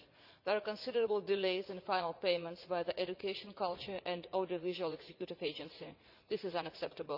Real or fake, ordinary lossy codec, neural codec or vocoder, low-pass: fake; Opus, 64 kbps; vocoder, 44.1 kHz, 80 mel bands, Vocos; 5.4 kHz